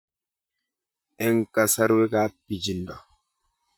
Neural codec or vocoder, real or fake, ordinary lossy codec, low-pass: vocoder, 44.1 kHz, 128 mel bands, Pupu-Vocoder; fake; none; none